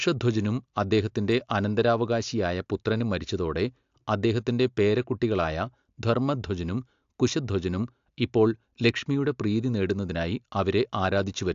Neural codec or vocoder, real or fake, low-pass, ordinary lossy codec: none; real; 7.2 kHz; AAC, 64 kbps